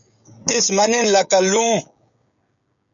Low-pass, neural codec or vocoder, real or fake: 7.2 kHz; codec, 16 kHz, 16 kbps, FreqCodec, smaller model; fake